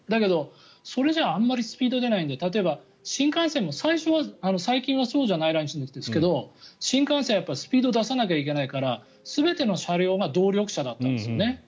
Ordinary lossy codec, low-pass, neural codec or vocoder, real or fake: none; none; none; real